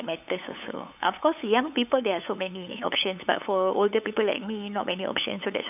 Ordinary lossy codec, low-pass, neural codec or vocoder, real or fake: none; 3.6 kHz; codec, 16 kHz, 8 kbps, FunCodec, trained on LibriTTS, 25 frames a second; fake